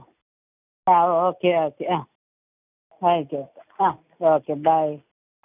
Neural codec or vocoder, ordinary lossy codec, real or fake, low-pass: none; none; real; 3.6 kHz